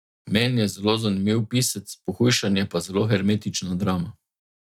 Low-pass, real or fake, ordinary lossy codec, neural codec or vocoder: 19.8 kHz; real; none; none